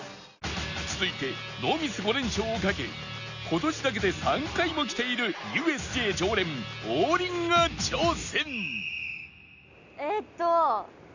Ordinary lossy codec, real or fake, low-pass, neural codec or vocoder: none; real; 7.2 kHz; none